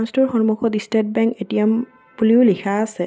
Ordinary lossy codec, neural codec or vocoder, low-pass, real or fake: none; none; none; real